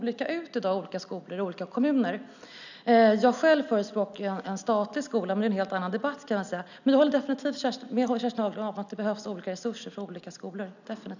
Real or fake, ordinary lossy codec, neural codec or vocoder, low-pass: real; none; none; 7.2 kHz